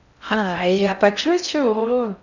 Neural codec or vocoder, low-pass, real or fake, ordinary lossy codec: codec, 16 kHz in and 24 kHz out, 0.6 kbps, FocalCodec, streaming, 4096 codes; 7.2 kHz; fake; none